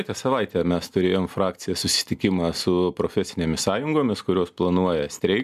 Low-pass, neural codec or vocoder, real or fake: 14.4 kHz; none; real